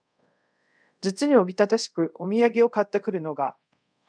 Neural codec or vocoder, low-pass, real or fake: codec, 24 kHz, 0.5 kbps, DualCodec; 9.9 kHz; fake